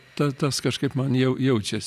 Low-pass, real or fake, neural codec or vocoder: 14.4 kHz; real; none